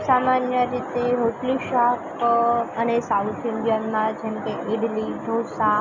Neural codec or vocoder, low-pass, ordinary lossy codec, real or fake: none; 7.2 kHz; none; real